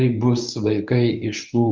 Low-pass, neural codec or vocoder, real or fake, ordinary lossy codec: 7.2 kHz; codec, 24 kHz, 0.9 kbps, WavTokenizer, medium speech release version 1; fake; Opus, 24 kbps